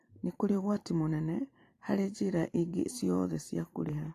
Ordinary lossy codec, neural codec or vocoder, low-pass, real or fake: AAC, 48 kbps; vocoder, 44.1 kHz, 128 mel bands every 512 samples, BigVGAN v2; 14.4 kHz; fake